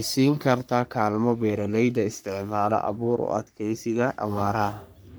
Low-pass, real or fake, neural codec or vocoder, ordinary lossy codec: none; fake; codec, 44.1 kHz, 3.4 kbps, Pupu-Codec; none